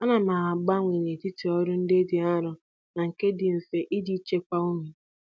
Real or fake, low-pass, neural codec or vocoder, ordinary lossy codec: real; none; none; none